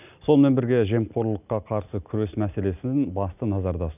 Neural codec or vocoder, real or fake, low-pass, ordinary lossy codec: none; real; 3.6 kHz; none